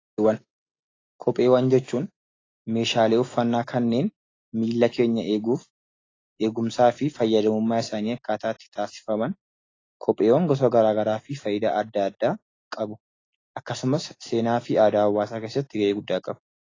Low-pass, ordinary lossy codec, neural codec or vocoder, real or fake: 7.2 kHz; AAC, 32 kbps; none; real